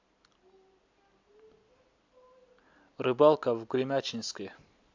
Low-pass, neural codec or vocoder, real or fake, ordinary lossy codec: 7.2 kHz; none; real; AAC, 48 kbps